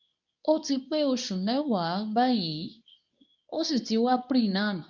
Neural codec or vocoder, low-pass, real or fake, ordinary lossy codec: codec, 24 kHz, 0.9 kbps, WavTokenizer, medium speech release version 2; 7.2 kHz; fake; none